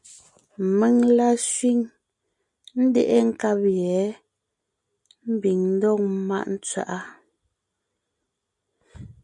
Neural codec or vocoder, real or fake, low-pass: none; real; 10.8 kHz